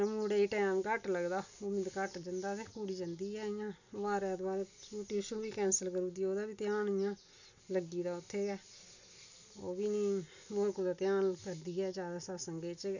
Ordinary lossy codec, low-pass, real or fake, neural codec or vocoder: none; 7.2 kHz; real; none